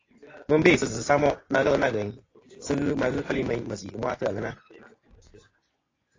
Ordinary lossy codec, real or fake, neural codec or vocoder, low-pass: AAC, 32 kbps; fake; vocoder, 24 kHz, 100 mel bands, Vocos; 7.2 kHz